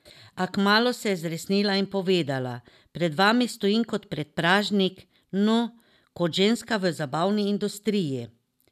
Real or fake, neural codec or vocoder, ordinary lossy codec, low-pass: real; none; none; 14.4 kHz